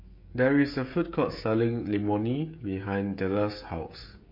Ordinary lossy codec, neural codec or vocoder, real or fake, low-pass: MP3, 32 kbps; codec, 16 kHz, 16 kbps, FreqCodec, smaller model; fake; 5.4 kHz